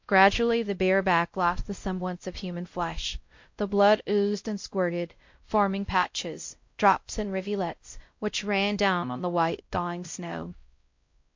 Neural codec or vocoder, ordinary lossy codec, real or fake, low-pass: codec, 16 kHz, 0.5 kbps, X-Codec, WavLM features, trained on Multilingual LibriSpeech; MP3, 48 kbps; fake; 7.2 kHz